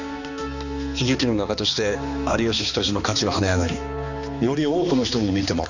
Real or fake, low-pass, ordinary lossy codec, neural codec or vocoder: fake; 7.2 kHz; none; codec, 16 kHz, 2 kbps, X-Codec, HuBERT features, trained on balanced general audio